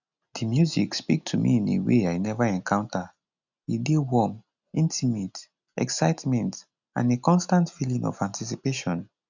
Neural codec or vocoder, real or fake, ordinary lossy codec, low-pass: none; real; none; 7.2 kHz